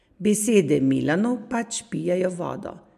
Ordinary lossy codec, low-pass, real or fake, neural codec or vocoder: MP3, 64 kbps; 14.4 kHz; real; none